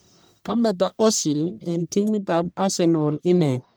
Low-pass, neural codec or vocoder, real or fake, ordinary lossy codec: none; codec, 44.1 kHz, 1.7 kbps, Pupu-Codec; fake; none